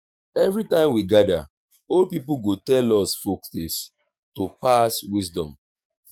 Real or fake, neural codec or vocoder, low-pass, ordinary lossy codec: fake; codec, 44.1 kHz, 7.8 kbps, DAC; 19.8 kHz; none